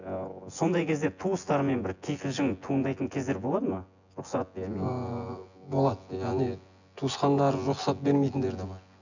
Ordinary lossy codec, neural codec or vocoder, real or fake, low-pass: none; vocoder, 24 kHz, 100 mel bands, Vocos; fake; 7.2 kHz